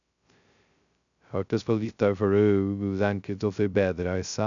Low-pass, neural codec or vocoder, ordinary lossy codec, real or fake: 7.2 kHz; codec, 16 kHz, 0.2 kbps, FocalCodec; none; fake